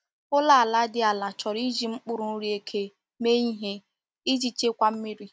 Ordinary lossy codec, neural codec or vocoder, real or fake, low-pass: none; none; real; none